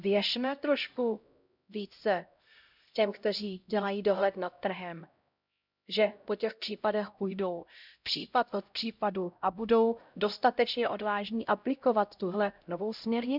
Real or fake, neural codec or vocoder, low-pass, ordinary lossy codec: fake; codec, 16 kHz, 0.5 kbps, X-Codec, HuBERT features, trained on LibriSpeech; 5.4 kHz; none